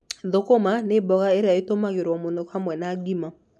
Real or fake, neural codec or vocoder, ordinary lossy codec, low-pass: real; none; none; none